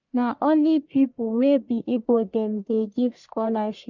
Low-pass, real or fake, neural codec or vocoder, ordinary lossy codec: 7.2 kHz; fake; codec, 44.1 kHz, 1.7 kbps, Pupu-Codec; none